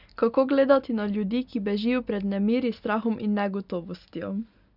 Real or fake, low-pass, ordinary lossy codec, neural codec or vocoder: real; 5.4 kHz; none; none